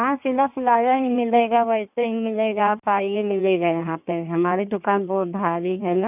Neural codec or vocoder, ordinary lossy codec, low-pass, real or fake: codec, 16 kHz in and 24 kHz out, 1.1 kbps, FireRedTTS-2 codec; none; 3.6 kHz; fake